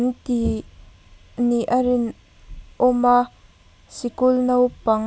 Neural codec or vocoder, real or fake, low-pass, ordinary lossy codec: none; real; none; none